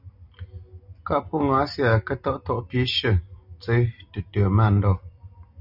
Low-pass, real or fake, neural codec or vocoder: 5.4 kHz; real; none